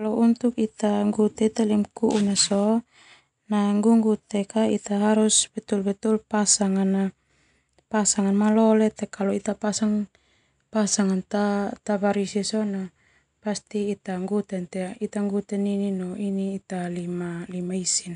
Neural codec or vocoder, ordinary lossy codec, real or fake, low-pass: none; none; real; 9.9 kHz